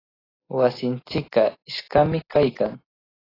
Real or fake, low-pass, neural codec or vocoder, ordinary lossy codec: real; 5.4 kHz; none; AAC, 24 kbps